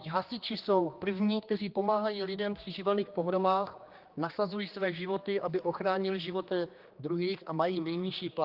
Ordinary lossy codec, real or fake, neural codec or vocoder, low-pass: Opus, 32 kbps; fake; codec, 16 kHz, 2 kbps, X-Codec, HuBERT features, trained on general audio; 5.4 kHz